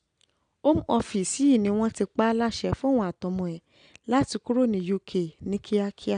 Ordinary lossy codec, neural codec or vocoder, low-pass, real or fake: none; none; 9.9 kHz; real